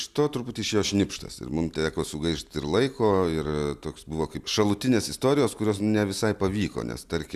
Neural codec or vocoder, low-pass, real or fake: none; 14.4 kHz; real